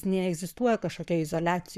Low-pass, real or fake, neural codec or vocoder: 14.4 kHz; fake; codec, 44.1 kHz, 7.8 kbps, Pupu-Codec